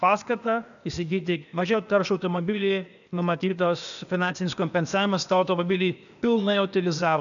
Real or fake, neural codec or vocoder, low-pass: fake; codec, 16 kHz, 0.8 kbps, ZipCodec; 7.2 kHz